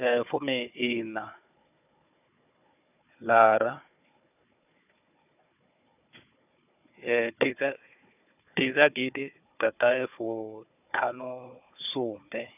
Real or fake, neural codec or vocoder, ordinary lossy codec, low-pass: fake; codec, 16 kHz, 4 kbps, FunCodec, trained on Chinese and English, 50 frames a second; none; 3.6 kHz